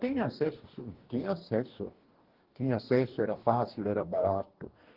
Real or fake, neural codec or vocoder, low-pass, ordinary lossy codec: fake; codec, 44.1 kHz, 2.6 kbps, DAC; 5.4 kHz; Opus, 16 kbps